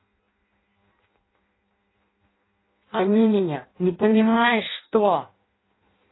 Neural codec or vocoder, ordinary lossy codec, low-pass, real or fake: codec, 16 kHz in and 24 kHz out, 0.6 kbps, FireRedTTS-2 codec; AAC, 16 kbps; 7.2 kHz; fake